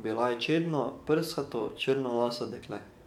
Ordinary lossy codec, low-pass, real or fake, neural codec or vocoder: MP3, 96 kbps; 19.8 kHz; fake; codec, 44.1 kHz, 7.8 kbps, DAC